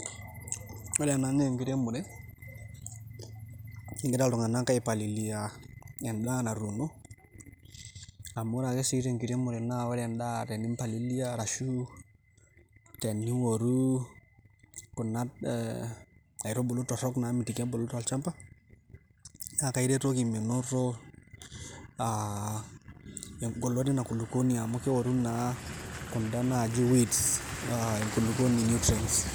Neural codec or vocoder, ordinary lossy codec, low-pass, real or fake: none; none; none; real